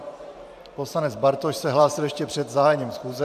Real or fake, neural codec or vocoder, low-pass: fake; vocoder, 44.1 kHz, 128 mel bands every 512 samples, BigVGAN v2; 14.4 kHz